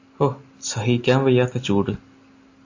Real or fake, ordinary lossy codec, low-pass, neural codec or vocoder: real; AAC, 48 kbps; 7.2 kHz; none